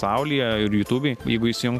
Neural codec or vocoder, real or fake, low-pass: none; real; 14.4 kHz